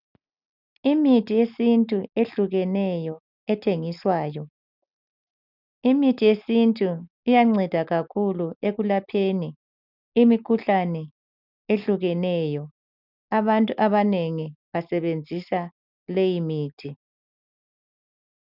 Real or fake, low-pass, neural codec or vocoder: real; 5.4 kHz; none